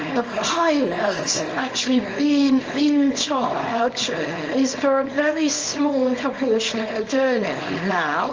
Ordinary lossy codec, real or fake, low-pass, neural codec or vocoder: Opus, 24 kbps; fake; 7.2 kHz; codec, 24 kHz, 0.9 kbps, WavTokenizer, small release